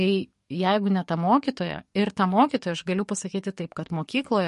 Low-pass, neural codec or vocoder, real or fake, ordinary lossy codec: 14.4 kHz; codec, 44.1 kHz, 7.8 kbps, DAC; fake; MP3, 48 kbps